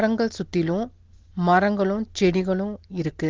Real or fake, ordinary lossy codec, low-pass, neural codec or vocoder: real; Opus, 16 kbps; 7.2 kHz; none